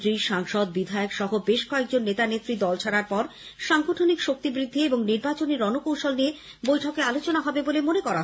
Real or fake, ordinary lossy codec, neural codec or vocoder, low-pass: real; none; none; none